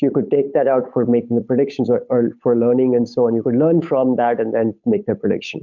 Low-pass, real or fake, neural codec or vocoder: 7.2 kHz; fake; codec, 16 kHz, 8 kbps, FunCodec, trained on Chinese and English, 25 frames a second